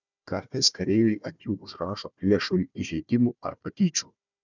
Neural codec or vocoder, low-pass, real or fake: codec, 16 kHz, 1 kbps, FunCodec, trained on Chinese and English, 50 frames a second; 7.2 kHz; fake